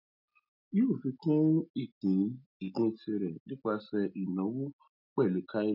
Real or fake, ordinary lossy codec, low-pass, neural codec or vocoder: real; none; 5.4 kHz; none